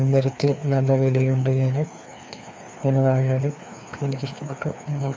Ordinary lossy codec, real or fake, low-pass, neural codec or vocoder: none; fake; none; codec, 16 kHz, 2 kbps, FreqCodec, larger model